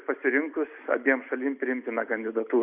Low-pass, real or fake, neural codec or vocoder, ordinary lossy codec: 3.6 kHz; real; none; Opus, 64 kbps